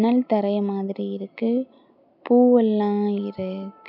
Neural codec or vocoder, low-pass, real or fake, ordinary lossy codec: none; 5.4 kHz; real; none